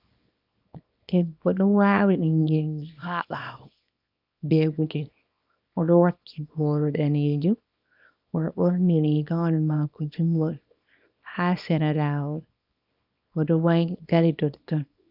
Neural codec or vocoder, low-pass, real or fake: codec, 24 kHz, 0.9 kbps, WavTokenizer, small release; 5.4 kHz; fake